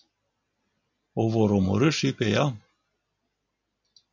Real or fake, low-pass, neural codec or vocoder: real; 7.2 kHz; none